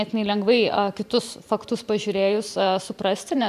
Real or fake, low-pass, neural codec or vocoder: fake; 14.4 kHz; vocoder, 44.1 kHz, 128 mel bands every 512 samples, BigVGAN v2